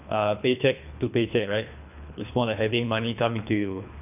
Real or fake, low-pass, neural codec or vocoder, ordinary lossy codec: fake; 3.6 kHz; codec, 24 kHz, 3 kbps, HILCodec; none